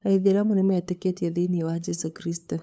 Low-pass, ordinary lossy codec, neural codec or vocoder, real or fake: none; none; codec, 16 kHz, 8 kbps, FunCodec, trained on LibriTTS, 25 frames a second; fake